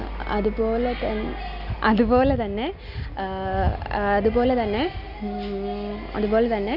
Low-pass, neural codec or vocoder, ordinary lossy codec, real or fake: 5.4 kHz; none; none; real